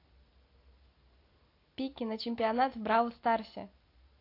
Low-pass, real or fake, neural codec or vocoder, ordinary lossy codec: 5.4 kHz; real; none; AAC, 32 kbps